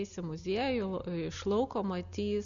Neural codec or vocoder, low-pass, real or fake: none; 7.2 kHz; real